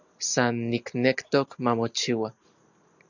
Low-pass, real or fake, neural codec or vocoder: 7.2 kHz; real; none